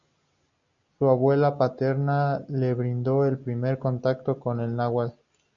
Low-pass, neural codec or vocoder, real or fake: 7.2 kHz; none; real